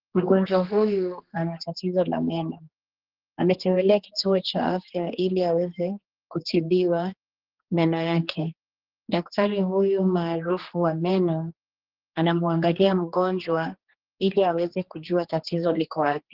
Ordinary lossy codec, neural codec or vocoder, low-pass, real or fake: Opus, 16 kbps; codec, 16 kHz, 2 kbps, X-Codec, HuBERT features, trained on general audio; 5.4 kHz; fake